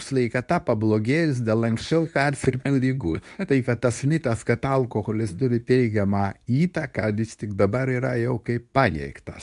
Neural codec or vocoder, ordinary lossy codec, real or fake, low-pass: codec, 24 kHz, 0.9 kbps, WavTokenizer, medium speech release version 2; AAC, 96 kbps; fake; 10.8 kHz